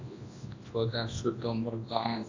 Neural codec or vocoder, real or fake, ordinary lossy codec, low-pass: codec, 24 kHz, 0.9 kbps, WavTokenizer, large speech release; fake; AAC, 32 kbps; 7.2 kHz